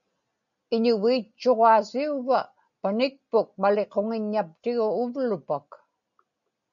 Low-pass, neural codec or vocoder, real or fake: 7.2 kHz; none; real